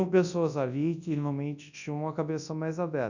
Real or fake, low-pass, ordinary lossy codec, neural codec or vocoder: fake; 7.2 kHz; none; codec, 24 kHz, 0.9 kbps, WavTokenizer, large speech release